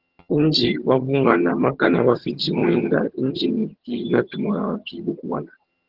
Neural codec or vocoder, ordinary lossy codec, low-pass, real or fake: vocoder, 22.05 kHz, 80 mel bands, HiFi-GAN; Opus, 16 kbps; 5.4 kHz; fake